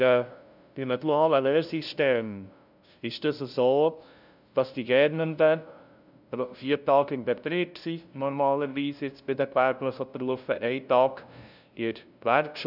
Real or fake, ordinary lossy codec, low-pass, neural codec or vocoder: fake; none; 5.4 kHz; codec, 16 kHz, 0.5 kbps, FunCodec, trained on LibriTTS, 25 frames a second